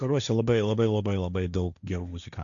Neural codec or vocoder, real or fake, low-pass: codec, 16 kHz, 1.1 kbps, Voila-Tokenizer; fake; 7.2 kHz